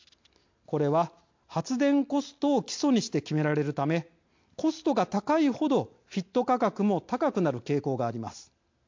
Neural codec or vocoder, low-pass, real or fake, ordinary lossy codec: none; 7.2 kHz; real; MP3, 48 kbps